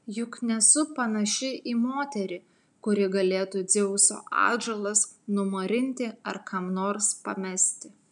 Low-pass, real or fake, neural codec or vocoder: 10.8 kHz; real; none